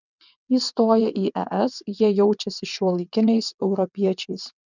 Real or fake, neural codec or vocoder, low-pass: fake; vocoder, 22.05 kHz, 80 mel bands, WaveNeXt; 7.2 kHz